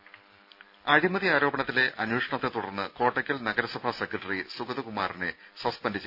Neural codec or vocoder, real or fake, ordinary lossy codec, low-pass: none; real; none; 5.4 kHz